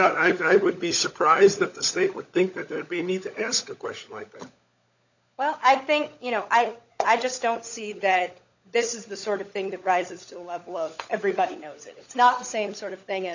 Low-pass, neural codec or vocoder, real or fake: 7.2 kHz; codec, 16 kHz, 16 kbps, FunCodec, trained on LibriTTS, 50 frames a second; fake